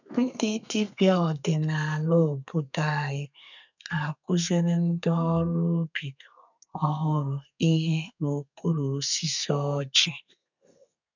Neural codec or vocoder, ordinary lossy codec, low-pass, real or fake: codec, 32 kHz, 1.9 kbps, SNAC; none; 7.2 kHz; fake